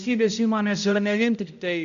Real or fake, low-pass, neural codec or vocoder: fake; 7.2 kHz; codec, 16 kHz, 0.5 kbps, X-Codec, HuBERT features, trained on balanced general audio